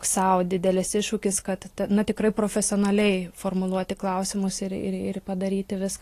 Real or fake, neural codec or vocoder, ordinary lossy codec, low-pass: real; none; AAC, 48 kbps; 14.4 kHz